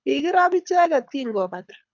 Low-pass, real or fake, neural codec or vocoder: 7.2 kHz; fake; codec, 24 kHz, 6 kbps, HILCodec